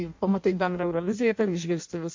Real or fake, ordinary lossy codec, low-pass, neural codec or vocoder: fake; MP3, 48 kbps; 7.2 kHz; codec, 16 kHz in and 24 kHz out, 0.6 kbps, FireRedTTS-2 codec